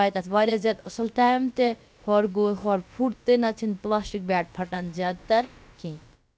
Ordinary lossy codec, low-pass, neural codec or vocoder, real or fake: none; none; codec, 16 kHz, about 1 kbps, DyCAST, with the encoder's durations; fake